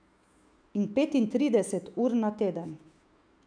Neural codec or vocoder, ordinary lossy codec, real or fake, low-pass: autoencoder, 48 kHz, 128 numbers a frame, DAC-VAE, trained on Japanese speech; none; fake; 9.9 kHz